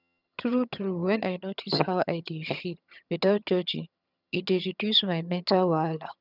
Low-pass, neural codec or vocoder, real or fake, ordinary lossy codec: 5.4 kHz; vocoder, 22.05 kHz, 80 mel bands, HiFi-GAN; fake; none